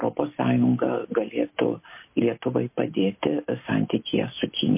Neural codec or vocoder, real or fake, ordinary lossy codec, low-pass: none; real; MP3, 24 kbps; 3.6 kHz